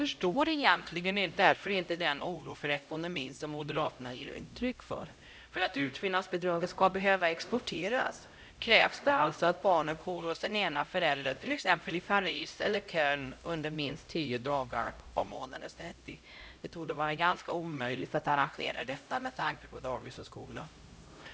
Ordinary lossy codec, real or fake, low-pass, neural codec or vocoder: none; fake; none; codec, 16 kHz, 0.5 kbps, X-Codec, HuBERT features, trained on LibriSpeech